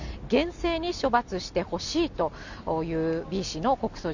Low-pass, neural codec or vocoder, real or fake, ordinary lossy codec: 7.2 kHz; none; real; none